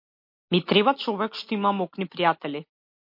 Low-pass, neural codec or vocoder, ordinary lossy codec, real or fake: 5.4 kHz; none; MP3, 32 kbps; real